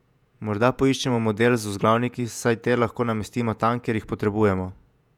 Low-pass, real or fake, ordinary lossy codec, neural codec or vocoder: 19.8 kHz; fake; none; vocoder, 48 kHz, 128 mel bands, Vocos